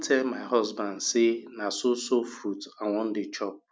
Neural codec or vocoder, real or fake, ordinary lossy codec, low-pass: none; real; none; none